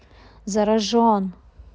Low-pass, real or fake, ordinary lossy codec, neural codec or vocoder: none; real; none; none